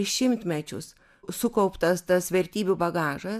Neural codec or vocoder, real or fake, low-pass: none; real; 14.4 kHz